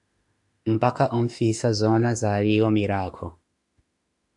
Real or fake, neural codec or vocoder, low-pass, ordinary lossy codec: fake; autoencoder, 48 kHz, 32 numbers a frame, DAC-VAE, trained on Japanese speech; 10.8 kHz; AAC, 64 kbps